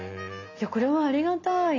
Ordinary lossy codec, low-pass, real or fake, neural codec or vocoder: AAC, 32 kbps; 7.2 kHz; real; none